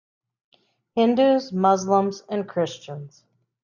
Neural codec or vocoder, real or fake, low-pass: none; real; 7.2 kHz